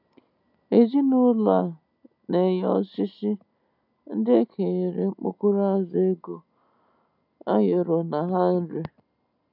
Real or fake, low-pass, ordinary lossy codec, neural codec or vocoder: real; 5.4 kHz; none; none